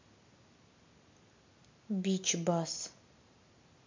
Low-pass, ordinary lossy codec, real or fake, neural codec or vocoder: 7.2 kHz; MP3, 48 kbps; real; none